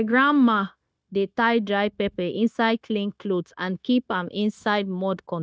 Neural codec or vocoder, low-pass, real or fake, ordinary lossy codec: codec, 16 kHz, 0.9 kbps, LongCat-Audio-Codec; none; fake; none